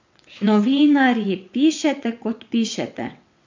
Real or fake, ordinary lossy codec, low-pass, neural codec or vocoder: fake; MP3, 64 kbps; 7.2 kHz; vocoder, 22.05 kHz, 80 mel bands, WaveNeXt